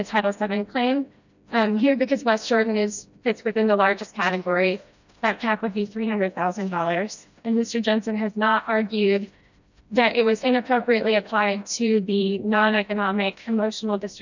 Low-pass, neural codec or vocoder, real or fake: 7.2 kHz; codec, 16 kHz, 1 kbps, FreqCodec, smaller model; fake